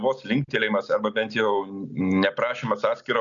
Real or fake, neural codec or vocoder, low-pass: real; none; 7.2 kHz